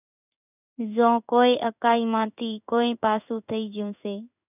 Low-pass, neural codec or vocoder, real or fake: 3.6 kHz; codec, 16 kHz in and 24 kHz out, 1 kbps, XY-Tokenizer; fake